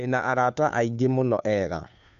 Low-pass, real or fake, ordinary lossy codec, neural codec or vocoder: 7.2 kHz; fake; none; codec, 16 kHz, 2 kbps, FunCodec, trained on Chinese and English, 25 frames a second